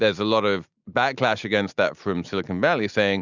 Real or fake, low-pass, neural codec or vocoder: real; 7.2 kHz; none